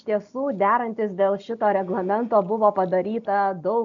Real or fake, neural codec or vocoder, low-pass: real; none; 7.2 kHz